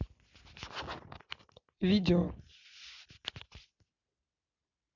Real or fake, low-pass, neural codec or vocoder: real; 7.2 kHz; none